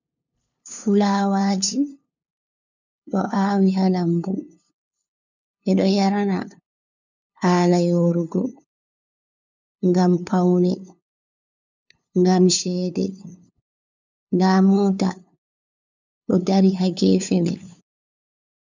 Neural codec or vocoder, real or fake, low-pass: codec, 16 kHz, 2 kbps, FunCodec, trained on LibriTTS, 25 frames a second; fake; 7.2 kHz